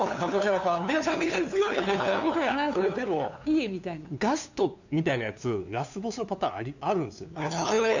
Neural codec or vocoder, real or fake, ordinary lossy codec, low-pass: codec, 16 kHz, 2 kbps, FunCodec, trained on LibriTTS, 25 frames a second; fake; none; 7.2 kHz